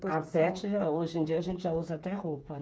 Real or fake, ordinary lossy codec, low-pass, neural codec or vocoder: fake; none; none; codec, 16 kHz, 8 kbps, FreqCodec, smaller model